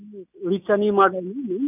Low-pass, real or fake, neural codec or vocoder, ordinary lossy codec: 3.6 kHz; real; none; none